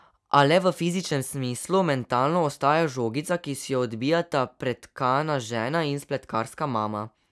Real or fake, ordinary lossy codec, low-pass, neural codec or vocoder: real; none; none; none